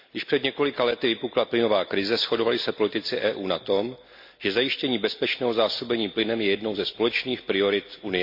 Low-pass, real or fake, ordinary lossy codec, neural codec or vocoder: 5.4 kHz; real; MP3, 48 kbps; none